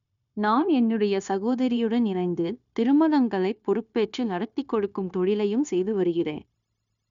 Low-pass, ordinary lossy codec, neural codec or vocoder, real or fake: 7.2 kHz; none; codec, 16 kHz, 0.9 kbps, LongCat-Audio-Codec; fake